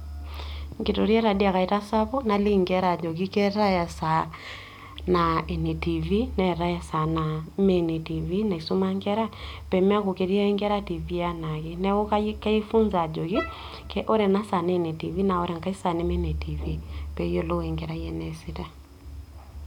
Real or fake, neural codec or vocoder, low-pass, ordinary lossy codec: real; none; 19.8 kHz; none